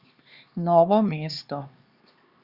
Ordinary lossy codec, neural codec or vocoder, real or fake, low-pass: Opus, 64 kbps; codec, 16 kHz, 2 kbps, X-Codec, HuBERT features, trained on LibriSpeech; fake; 5.4 kHz